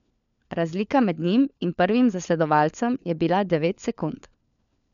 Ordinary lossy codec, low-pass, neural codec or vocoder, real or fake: none; 7.2 kHz; codec, 16 kHz, 4 kbps, FunCodec, trained on LibriTTS, 50 frames a second; fake